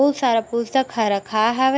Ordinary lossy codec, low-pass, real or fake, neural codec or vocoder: none; none; real; none